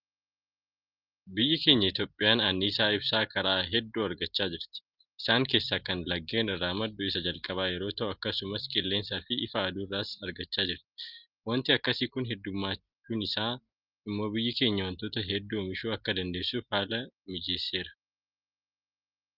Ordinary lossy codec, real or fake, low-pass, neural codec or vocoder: Opus, 32 kbps; real; 5.4 kHz; none